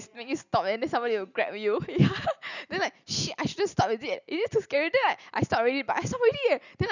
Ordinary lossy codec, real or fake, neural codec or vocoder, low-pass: none; real; none; 7.2 kHz